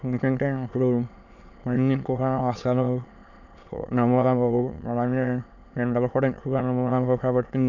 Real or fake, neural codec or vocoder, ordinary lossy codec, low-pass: fake; autoencoder, 22.05 kHz, a latent of 192 numbers a frame, VITS, trained on many speakers; none; 7.2 kHz